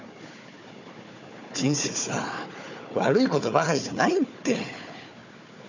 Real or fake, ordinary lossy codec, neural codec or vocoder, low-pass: fake; none; codec, 16 kHz, 4 kbps, FunCodec, trained on Chinese and English, 50 frames a second; 7.2 kHz